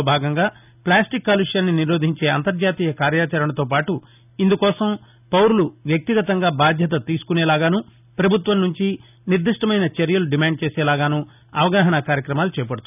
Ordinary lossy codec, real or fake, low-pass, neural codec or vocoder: none; real; 3.6 kHz; none